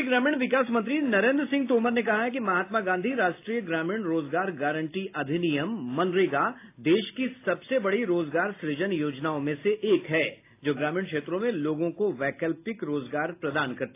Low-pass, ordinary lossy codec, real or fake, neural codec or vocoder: 3.6 kHz; AAC, 24 kbps; real; none